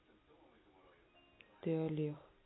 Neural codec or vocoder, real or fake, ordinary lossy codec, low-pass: none; real; AAC, 16 kbps; 7.2 kHz